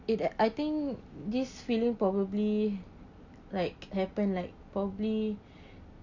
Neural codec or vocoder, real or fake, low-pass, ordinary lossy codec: none; real; 7.2 kHz; none